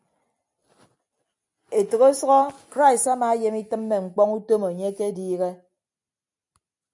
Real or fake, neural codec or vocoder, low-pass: real; none; 10.8 kHz